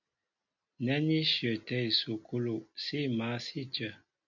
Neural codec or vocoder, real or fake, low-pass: none; real; 7.2 kHz